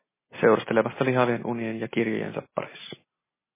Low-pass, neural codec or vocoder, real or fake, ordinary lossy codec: 3.6 kHz; none; real; MP3, 16 kbps